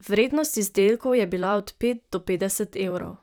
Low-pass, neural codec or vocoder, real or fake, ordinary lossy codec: none; vocoder, 44.1 kHz, 128 mel bands, Pupu-Vocoder; fake; none